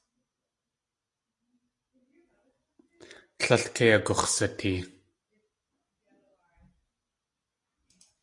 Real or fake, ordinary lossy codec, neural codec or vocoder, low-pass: real; AAC, 64 kbps; none; 10.8 kHz